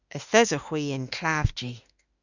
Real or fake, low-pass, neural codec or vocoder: fake; 7.2 kHz; autoencoder, 48 kHz, 32 numbers a frame, DAC-VAE, trained on Japanese speech